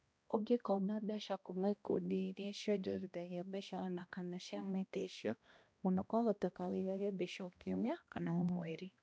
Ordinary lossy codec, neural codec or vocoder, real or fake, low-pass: none; codec, 16 kHz, 1 kbps, X-Codec, HuBERT features, trained on balanced general audio; fake; none